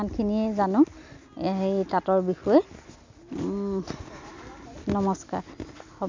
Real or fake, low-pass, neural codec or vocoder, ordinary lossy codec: real; 7.2 kHz; none; MP3, 64 kbps